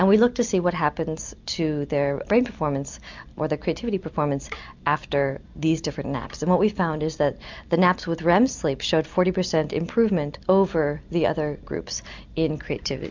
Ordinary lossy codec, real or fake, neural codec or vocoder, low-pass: MP3, 64 kbps; real; none; 7.2 kHz